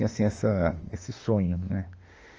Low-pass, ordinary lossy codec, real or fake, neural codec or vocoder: 7.2 kHz; Opus, 24 kbps; fake; autoencoder, 48 kHz, 32 numbers a frame, DAC-VAE, trained on Japanese speech